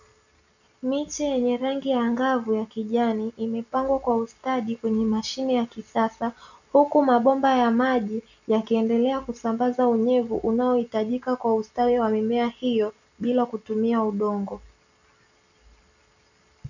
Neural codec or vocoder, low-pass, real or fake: none; 7.2 kHz; real